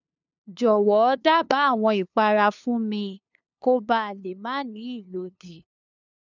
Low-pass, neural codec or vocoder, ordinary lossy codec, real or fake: 7.2 kHz; codec, 16 kHz, 2 kbps, FunCodec, trained on LibriTTS, 25 frames a second; none; fake